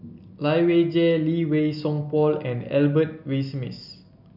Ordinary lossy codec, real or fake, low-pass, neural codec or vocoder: none; real; 5.4 kHz; none